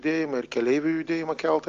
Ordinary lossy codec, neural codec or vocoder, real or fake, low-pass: Opus, 32 kbps; none; real; 7.2 kHz